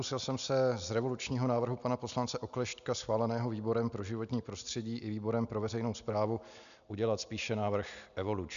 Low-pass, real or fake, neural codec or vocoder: 7.2 kHz; real; none